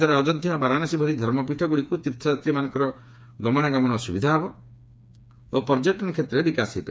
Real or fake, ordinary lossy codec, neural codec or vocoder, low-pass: fake; none; codec, 16 kHz, 4 kbps, FreqCodec, smaller model; none